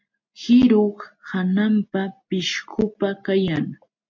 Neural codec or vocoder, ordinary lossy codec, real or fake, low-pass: none; MP3, 48 kbps; real; 7.2 kHz